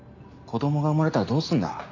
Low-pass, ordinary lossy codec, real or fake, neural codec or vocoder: 7.2 kHz; none; real; none